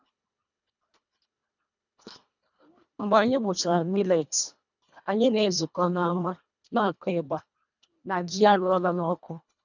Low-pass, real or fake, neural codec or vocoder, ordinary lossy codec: 7.2 kHz; fake; codec, 24 kHz, 1.5 kbps, HILCodec; none